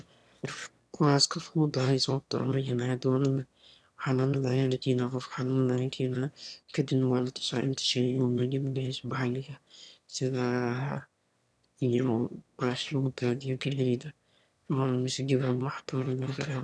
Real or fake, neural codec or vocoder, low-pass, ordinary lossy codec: fake; autoencoder, 22.05 kHz, a latent of 192 numbers a frame, VITS, trained on one speaker; none; none